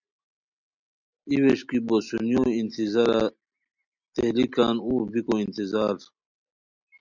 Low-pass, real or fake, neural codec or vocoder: 7.2 kHz; real; none